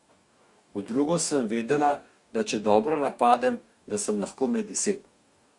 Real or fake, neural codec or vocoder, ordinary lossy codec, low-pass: fake; codec, 44.1 kHz, 2.6 kbps, DAC; MP3, 64 kbps; 10.8 kHz